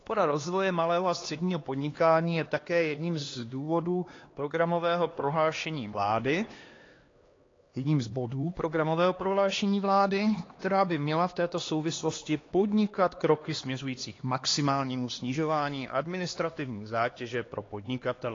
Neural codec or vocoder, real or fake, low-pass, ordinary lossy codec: codec, 16 kHz, 2 kbps, X-Codec, HuBERT features, trained on LibriSpeech; fake; 7.2 kHz; AAC, 32 kbps